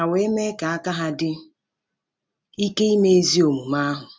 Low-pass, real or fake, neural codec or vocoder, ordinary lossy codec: none; real; none; none